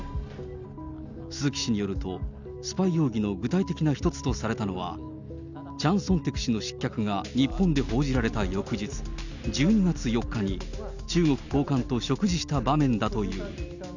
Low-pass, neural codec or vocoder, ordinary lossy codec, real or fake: 7.2 kHz; none; none; real